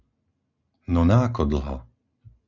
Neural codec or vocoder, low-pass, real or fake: none; 7.2 kHz; real